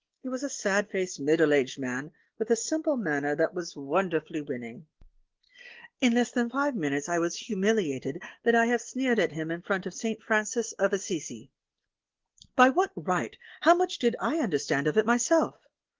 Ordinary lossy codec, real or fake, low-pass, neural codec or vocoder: Opus, 16 kbps; real; 7.2 kHz; none